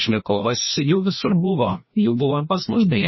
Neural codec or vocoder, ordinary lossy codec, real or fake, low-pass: codec, 16 kHz, 1 kbps, FreqCodec, larger model; MP3, 24 kbps; fake; 7.2 kHz